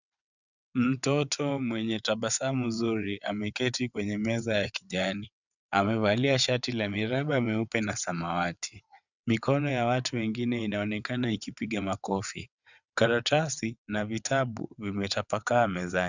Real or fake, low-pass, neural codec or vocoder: fake; 7.2 kHz; vocoder, 22.05 kHz, 80 mel bands, WaveNeXt